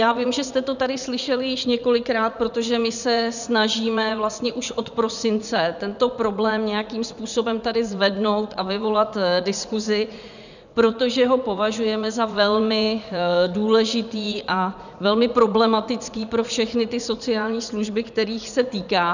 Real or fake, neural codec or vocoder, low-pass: fake; vocoder, 44.1 kHz, 80 mel bands, Vocos; 7.2 kHz